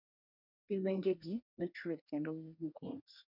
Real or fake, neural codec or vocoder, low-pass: fake; codec, 24 kHz, 1 kbps, SNAC; 5.4 kHz